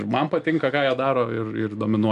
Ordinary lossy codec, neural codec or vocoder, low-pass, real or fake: MP3, 96 kbps; none; 10.8 kHz; real